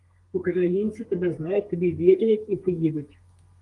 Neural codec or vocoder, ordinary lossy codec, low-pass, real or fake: codec, 32 kHz, 1.9 kbps, SNAC; Opus, 24 kbps; 10.8 kHz; fake